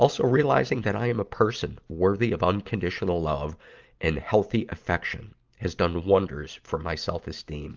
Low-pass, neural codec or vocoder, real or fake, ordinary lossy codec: 7.2 kHz; none; real; Opus, 32 kbps